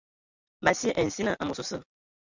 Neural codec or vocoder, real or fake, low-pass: none; real; 7.2 kHz